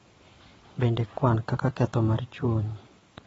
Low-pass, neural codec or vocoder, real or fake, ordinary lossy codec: 9.9 kHz; vocoder, 22.05 kHz, 80 mel bands, WaveNeXt; fake; AAC, 24 kbps